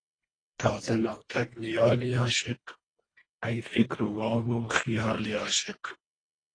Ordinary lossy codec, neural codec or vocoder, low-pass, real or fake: AAC, 32 kbps; codec, 24 kHz, 1.5 kbps, HILCodec; 9.9 kHz; fake